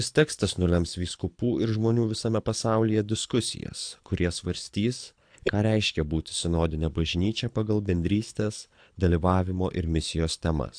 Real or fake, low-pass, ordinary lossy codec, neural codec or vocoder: fake; 9.9 kHz; AAC, 64 kbps; codec, 24 kHz, 6 kbps, HILCodec